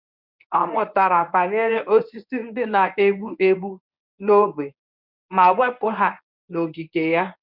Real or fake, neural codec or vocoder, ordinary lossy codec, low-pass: fake; codec, 24 kHz, 0.9 kbps, WavTokenizer, medium speech release version 1; none; 5.4 kHz